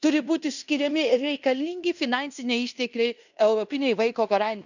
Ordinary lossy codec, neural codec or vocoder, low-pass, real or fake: none; codec, 16 kHz in and 24 kHz out, 0.9 kbps, LongCat-Audio-Codec, fine tuned four codebook decoder; 7.2 kHz; fake